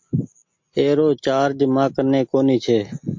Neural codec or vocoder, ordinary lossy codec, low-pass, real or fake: none; MP3, 48 kbps; 7.2 kHz; real